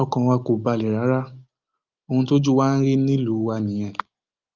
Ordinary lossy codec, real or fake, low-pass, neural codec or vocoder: Opus, 32 kbps; real; 7.2 kHz; none